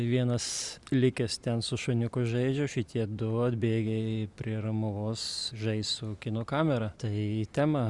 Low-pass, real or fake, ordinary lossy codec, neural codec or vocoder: 10.8 kHz; real; Opus, 64 kbps; none